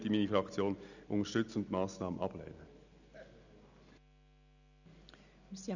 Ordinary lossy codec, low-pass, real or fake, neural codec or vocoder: none; 7.2 kHz; real; none